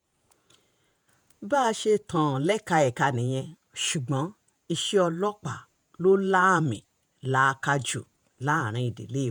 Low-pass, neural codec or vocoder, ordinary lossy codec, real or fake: none; vocoder, 48 kHz, 128 mel bands, Vocos; none; fake